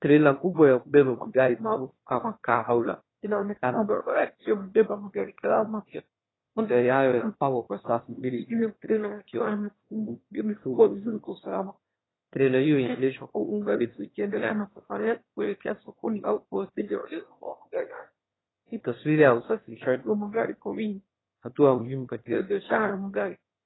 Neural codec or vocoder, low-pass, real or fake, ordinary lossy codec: autoencoder, 22.05 kHz, a latent of 192 numbers a frame, VITS, trained on one speaker; 7.2 kHz; fake; AAC, 16 kbps